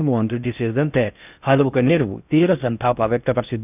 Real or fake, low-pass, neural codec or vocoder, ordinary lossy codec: fake; 3.6 kHz; codec, 16 kHz in and 24 kHz out, 0.6 kbps, FocalCodec, streaming, 2048 codes; none